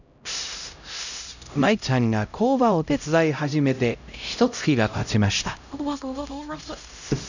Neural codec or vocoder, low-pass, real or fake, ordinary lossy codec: codec, 16 kHz, 0.5 kbps, X-Codec, HuBERT features, trained on LibriSpeech; 7.2 kHz; fake; none